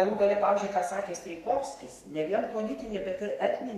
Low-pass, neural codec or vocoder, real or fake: 14.4 kHz; codec, 32 kHz, 1.9 kbps, SNAC; fake